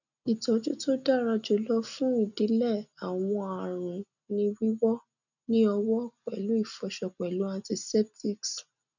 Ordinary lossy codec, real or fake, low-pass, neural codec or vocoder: none; real; 7.2 kHz; none